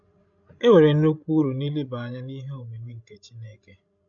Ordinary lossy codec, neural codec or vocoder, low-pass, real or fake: none; codec, 16 kHz, 16 kbps, FreqCodec, larger model; 7.2 kHz; fake